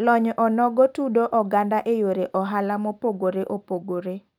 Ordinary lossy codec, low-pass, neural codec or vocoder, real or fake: none; 19.8 kHz; none; real